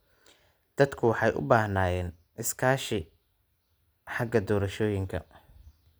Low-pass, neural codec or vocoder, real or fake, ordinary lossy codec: none; none; real; none